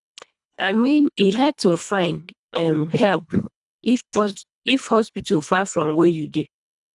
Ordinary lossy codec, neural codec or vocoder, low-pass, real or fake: none; codec, 24 kHz, 1.5 kbps, HILCodec; 10.8 kHz; fake